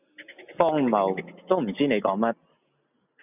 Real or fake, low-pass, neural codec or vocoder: real; 3.6 kHz; none